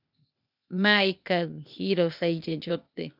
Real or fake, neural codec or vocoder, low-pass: fake; codec, 16 kHz, 0.8 kbps, ZipCodec; 5.4 kHz